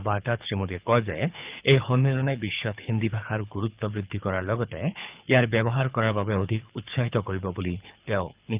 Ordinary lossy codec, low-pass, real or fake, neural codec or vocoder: Opus, 24 kbps; 3.6 kHz; fake; codec, 24 kHz, 6 kbps, HILCodec